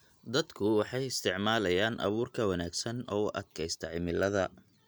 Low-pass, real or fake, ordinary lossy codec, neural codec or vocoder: none; real; none; none